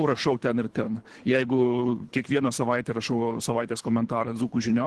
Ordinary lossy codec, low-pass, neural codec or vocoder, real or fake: Opus, 16 kbps; 10.8 kHz; codec, 24 kHz, 3 kbps, HILCodec; fake